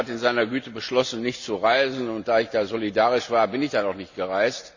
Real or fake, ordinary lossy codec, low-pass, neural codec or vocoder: real; none; 7.2 kHz; none